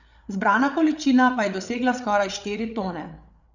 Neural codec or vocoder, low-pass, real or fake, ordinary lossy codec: codec, 16 kHz, 8 kbps, FreqCodec, larger model; 7.2 kHz; fake; none